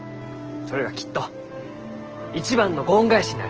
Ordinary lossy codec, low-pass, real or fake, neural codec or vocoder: Opus, 16 kbps; 7.2 kHz; real; none